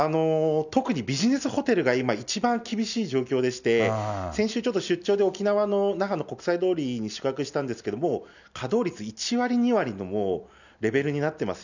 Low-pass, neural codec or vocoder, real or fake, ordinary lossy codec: 7.2 kHz; none; real; none